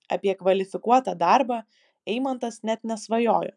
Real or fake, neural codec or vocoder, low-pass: real; none; 10.8 kHz